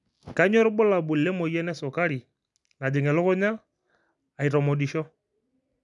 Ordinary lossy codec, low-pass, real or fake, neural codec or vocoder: none; 10.8 kHz; real; none